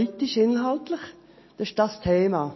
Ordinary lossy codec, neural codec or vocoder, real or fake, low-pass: MP3, 24 kbps; none; real; 7.2 kHz